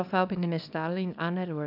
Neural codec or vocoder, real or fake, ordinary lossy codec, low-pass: codec, 24 kHz, 0.9 kbps, WavTokenizer, small release; fake; none; 5.4 kHz